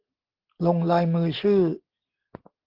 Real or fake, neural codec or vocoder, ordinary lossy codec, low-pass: real; none; Opus, 16 kbps; 5.4 kHz